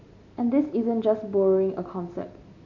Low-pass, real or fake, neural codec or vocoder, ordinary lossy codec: 7.2 kHz; real; none; none